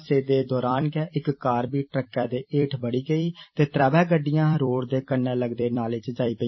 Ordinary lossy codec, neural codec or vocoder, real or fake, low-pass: MP3, 24 kbps; vocoder, 44.1 kHz, 128 mel bands every 256 samples, BigVGAN v2; fake; 7.2 kHz